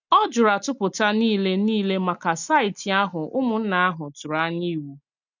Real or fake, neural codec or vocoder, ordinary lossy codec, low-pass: real; none; none; 7.2 kHz